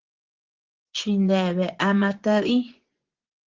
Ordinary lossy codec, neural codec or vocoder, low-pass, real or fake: Opus, 16 kbps; vocoder, 24 kHz, 100 mel bands, Vocos; 7.2 kHz; fake